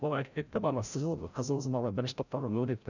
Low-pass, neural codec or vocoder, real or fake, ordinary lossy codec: 7.2 kHz; codec, 16 kHz, 0.5 kbps, FreqCodec, larger model; fake; none